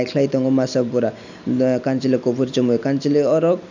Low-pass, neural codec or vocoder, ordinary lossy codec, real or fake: 7.2 kHz; none; none; real